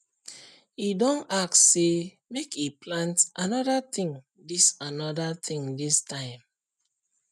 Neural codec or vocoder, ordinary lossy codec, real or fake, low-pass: none; none; real; none